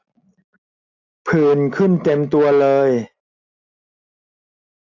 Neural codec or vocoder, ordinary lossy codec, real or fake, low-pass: none; AAC, 48 kbps; real; 7.2 kHz